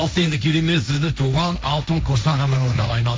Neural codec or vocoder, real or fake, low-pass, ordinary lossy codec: codec, 16 kHz, 1.1 kbps, Voila-Tokenizer; fake; none; none